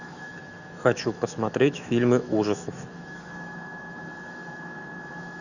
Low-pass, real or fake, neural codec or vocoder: 7.2 kHz; fake; autoencoder, 48 kHz, 128 numbers a frame, DAC-VAE, trained on Japanese speech